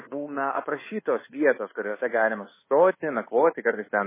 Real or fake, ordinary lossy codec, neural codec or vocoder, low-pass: fake; MP3, 16 kbps; codec, 24 kHz, 1.2 kbps, DualCodec; 3.6 kHz